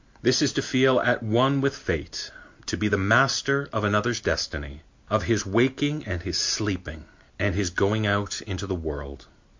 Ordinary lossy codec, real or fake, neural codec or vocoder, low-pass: MP3, 48 kbps; real; none; 7.2 kHz